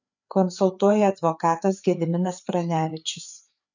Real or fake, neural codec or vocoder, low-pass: fake; codec, 16 kHz, 4 kbps, FreqCodec, larger model; 7.2 kHz